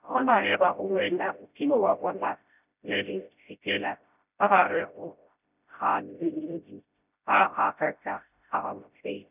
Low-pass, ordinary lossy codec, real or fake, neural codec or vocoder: 3.6 kHz; none; fake; codec, 16 kHz, 0.5 kbps, FreqCodec, smaller model